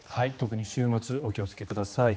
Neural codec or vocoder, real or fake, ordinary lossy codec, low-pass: codec, 16 kHz, 2 kbps, X-Codec, HuBERT features, trained on general audio; fake; none; none